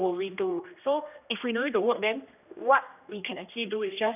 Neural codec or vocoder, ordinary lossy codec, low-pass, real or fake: codec, 16 kHz, 1 kbps, X-Codec, HuBERT features, trained on general audio; none; 3.6 kHz; fake